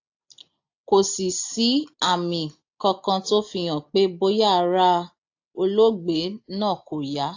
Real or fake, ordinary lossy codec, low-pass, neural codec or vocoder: real; AAC, 48 kbps; 7.2 kHz; none